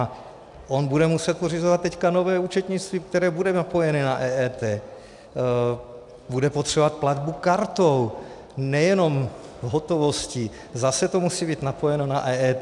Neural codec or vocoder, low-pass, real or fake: none; 10.8 kHz; real